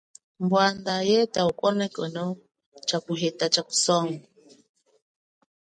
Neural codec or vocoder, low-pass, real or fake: none; 9.9 kHz; real